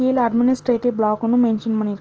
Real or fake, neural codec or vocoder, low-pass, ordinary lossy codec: real; none; 7.2 kHz; Opus, 16 kbps